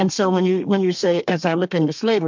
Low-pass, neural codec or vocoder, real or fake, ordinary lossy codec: 7.2 kHz; codec, 32 kHz, 1.9 kbps, SNAC; fake; MP3, 64 kbps